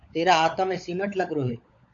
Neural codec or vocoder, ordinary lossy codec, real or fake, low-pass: codec, 16 kHz, 16 kbps, FunCodec, trained on LibriTTS, 50 frames a second; AAC, 48 kbps; fake; 7.2 kHz